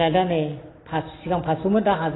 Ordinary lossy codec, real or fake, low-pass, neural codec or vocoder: AAC, 16 kbps; real; 7.2 kHz; none